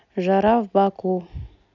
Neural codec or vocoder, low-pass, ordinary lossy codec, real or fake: none; 7.2 kHz; none; real